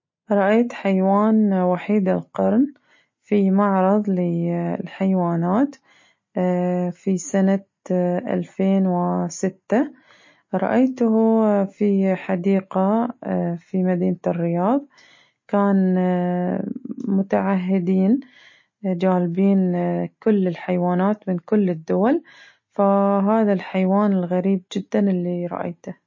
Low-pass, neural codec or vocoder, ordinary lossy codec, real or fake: 7.2 kHz; none; MP3, 32 kbps; real